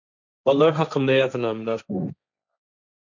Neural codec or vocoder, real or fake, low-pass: codec, 16 kHz, 1.1 kbps, Voila-Tokenizer; fake; 7.2 kHz